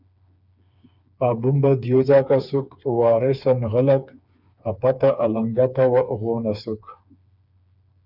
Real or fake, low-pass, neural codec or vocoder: fake; 5.4 kHz; codec, 16 kHz, 4 kbps, FreqCodec, smaller model